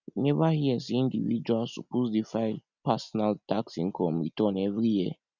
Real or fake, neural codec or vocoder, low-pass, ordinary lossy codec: real; none; 7.2 kHz; none